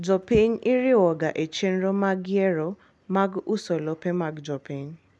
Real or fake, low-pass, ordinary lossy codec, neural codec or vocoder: real; none; none; none